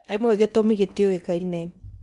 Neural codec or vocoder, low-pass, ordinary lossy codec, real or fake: codec, 16 kHz in and 24 kHz out, 0.8 kbps, FocalCodec, streaming, 65536 codes; 10.8 kHz; none; fake